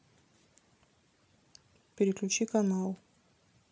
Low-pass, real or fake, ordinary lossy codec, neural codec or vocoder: none; real; none; none